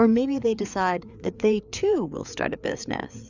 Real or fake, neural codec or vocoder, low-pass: fake; codec, 16 kHz, 4 kbps, FreqCodec, larger model; 7.2 kHz